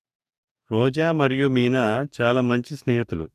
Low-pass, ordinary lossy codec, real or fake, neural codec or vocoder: 14.4 kHz; none; fake; codec, 44.1 kHz, 2.6 kbps, DAC